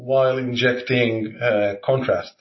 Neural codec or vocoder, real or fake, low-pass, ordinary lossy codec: none; real; 7.2 kHz; MP3, 24 kbps